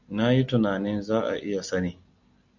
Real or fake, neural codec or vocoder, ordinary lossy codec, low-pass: real; none; MP3, 64 kbps; 7.2 kHz